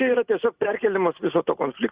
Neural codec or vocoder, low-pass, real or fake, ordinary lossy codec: none; 3.6 kHz; real; Opus, 64 kbps